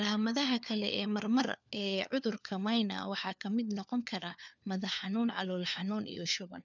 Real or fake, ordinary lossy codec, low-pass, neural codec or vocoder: fake; none; 7.2 kHz; codec, 16 kHz, 4 kbps, FunCodec, trained on LibriTTS, 50 frames a second